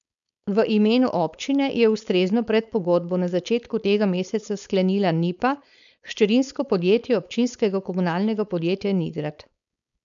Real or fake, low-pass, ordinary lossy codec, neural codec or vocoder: fake; 7.2 kHz; none; codec, 16 kHz, 4.8 kbps, FACodec